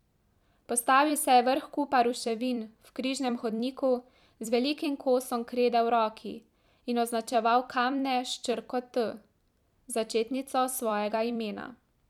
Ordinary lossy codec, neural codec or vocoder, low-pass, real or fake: none; vocoder, 44.1 kHz, 128 mel bands every 256 samples, BigVGAN v2; 19.8 kHz; fake